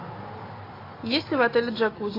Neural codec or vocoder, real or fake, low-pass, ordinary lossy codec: none; real; 5.4 kHz; AAC, 24 kbps